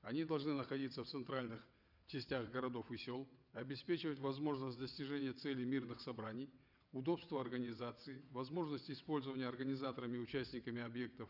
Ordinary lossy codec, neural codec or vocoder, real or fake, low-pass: none; vocoder, 22.05 kHz, 80 mel bands, WaveNeXt; fake; 5.4 kHz